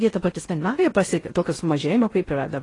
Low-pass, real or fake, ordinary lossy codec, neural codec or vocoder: 10.8 kHz; fake; AAC, 32 kbps; codec, 16 kHz in and 24 kHz out, 0.6 kbps, FocalCodec, streaming, 2048 codes